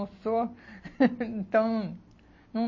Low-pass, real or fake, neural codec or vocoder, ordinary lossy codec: 7.2 kHz; real; none; MP3, 32 kbps